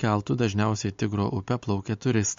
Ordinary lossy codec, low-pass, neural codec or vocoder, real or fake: MP3, 48 kbps; 7.2 kHz; none; real